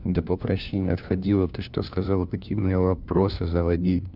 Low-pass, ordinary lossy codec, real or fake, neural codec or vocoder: 5.4 kHz; none; fake; codec, 16 kHz, 1 kbps, FunCodec, trained on LibriTTS, 50 frames a second